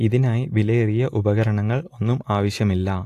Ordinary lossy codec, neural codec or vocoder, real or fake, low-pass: AAC, 64 kbps; none; real; 14.4 kHz